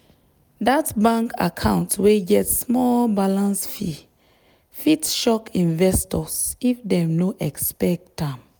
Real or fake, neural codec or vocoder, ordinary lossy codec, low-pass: real; none; none; none